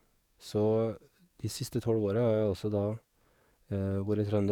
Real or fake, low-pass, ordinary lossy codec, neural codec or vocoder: fake; 19.8 kHz; none; codec, 44.1 kHz, 7.8 kbps, DAC